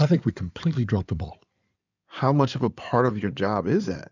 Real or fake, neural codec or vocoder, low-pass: fake; codec, 16 kHz in and 24 kHz out, 2.2 kbps, FireRedTTS-2 codec; 7.2 kHz